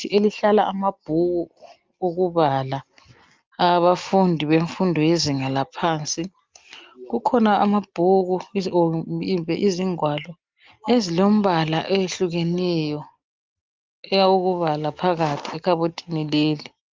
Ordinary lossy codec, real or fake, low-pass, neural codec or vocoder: Opus, 24 kbps; real; 7.2 kHz; none